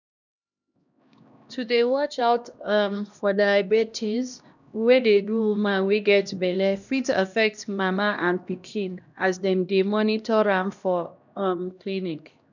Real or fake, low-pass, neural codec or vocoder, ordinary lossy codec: fake; 7.2 kHz; codec, 16 kHz, 1 kbps, X-Codec, HuBERT features, trained on LibriSpeech; none